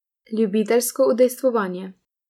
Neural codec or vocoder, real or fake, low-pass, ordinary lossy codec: none; real; 19.8 kHz; none